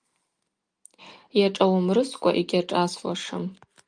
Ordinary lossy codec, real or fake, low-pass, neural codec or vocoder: Opus, 32 kbps; real; 9.9 kHz; none